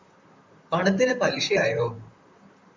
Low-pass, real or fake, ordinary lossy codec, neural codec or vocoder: 7.2 kHz; fake; MP3, 64 kbps; vocoder, 44.1 kHz, 128 mel bands, Pupu-Vocoder